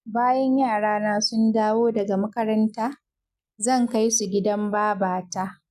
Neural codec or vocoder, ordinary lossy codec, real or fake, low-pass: none; none; real; 14.4 kHz